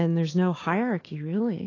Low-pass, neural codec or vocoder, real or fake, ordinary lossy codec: 7.2 kHz; codec, 16 kHz, 6 kbps, DAC; fake; AAC, 32 kbps